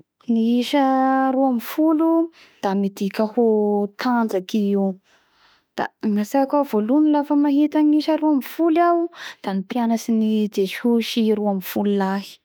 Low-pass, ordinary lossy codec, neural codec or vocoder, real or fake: none; none; autoencoder, 48 kHz, 32 numbers a frame, DAC-VAE, trained on Japanese speech; fake